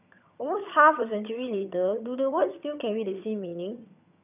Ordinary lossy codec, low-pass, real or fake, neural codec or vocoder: none; 3.6 kHz; fake; vocoder, 22.05 kHz, 80 mel bands, HiFi-GAN